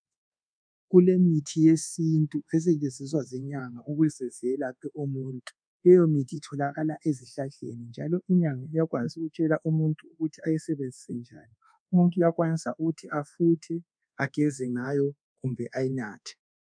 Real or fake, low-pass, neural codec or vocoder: fake; 9.9 kHz; codec, 24 kHz, 1.2 kbps, DualCodec